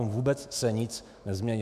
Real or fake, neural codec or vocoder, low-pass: fake; autoencoder, 48 kHz, 128 numbers a frame, DAC-VAE, trained on Japanese speech; 14.4 kHz